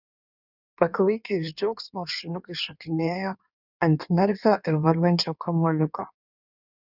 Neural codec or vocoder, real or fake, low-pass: codec, 16 kHz in and 24 kHz out, 1.1 kbps, FireRedTTS-2 codec; fake; 5.4 kHz